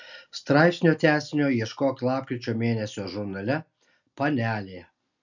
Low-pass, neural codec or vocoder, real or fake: 7.2 kHz; none; real